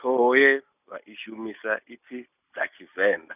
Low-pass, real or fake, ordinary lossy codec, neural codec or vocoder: 3.6 kHz; real; none; none